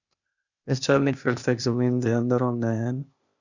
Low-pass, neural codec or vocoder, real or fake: 7.2 kHz; codec, 16 kHz, 0.8 kbps, ZipCodec; fake